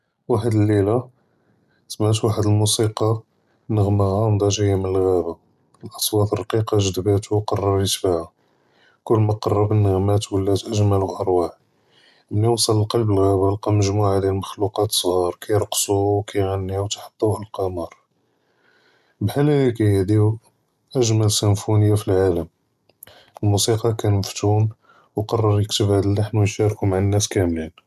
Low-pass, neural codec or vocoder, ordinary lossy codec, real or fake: 14.4 kHz; none; none; real